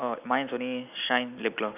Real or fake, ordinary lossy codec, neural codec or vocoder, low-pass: real; none; none; 3.6 kHz